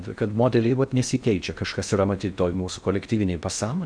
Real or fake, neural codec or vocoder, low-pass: fake; codec, 16 kHz in and 24 kHz out, 0.6 kbps, FocalCodec, streaming, 2048 codes; 9.9 kHz